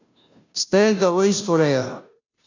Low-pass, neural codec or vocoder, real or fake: 7.2 kHz; codec, 16 kHz, 0.5 kbps, FunCodec, trained on Chinese and English, 25 frames a second; fake